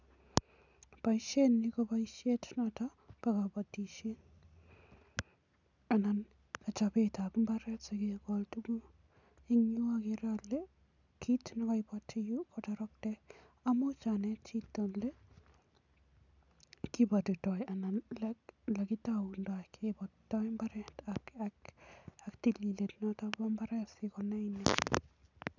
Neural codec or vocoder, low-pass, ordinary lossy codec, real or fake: none; 7.2 kHz; none; real